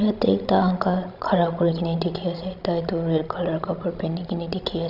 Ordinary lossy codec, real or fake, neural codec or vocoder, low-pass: none; fake; codec, 16 kHz, 16 kbps, FreqCodec, larger model; 5.4 kHz